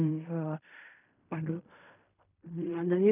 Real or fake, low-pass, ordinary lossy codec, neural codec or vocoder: fake; 3.6 kHz; none; codec, 16 kHz in and 24 kHz out, 0.4 kbps, LongCat-Audio-Codec, fine tuned four codebook decoder